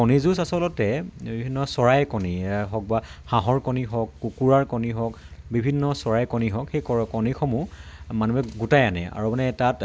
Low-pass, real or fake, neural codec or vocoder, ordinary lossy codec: none; real; none; none